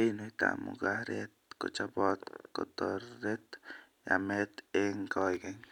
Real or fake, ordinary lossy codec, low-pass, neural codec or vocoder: real; none; 19.8 kHz; none